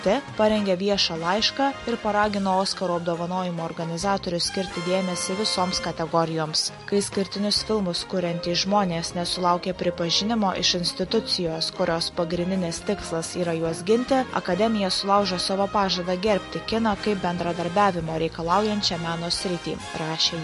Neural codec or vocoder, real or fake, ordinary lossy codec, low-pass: none; real; MP3, 48 kbps; 14.4 kHz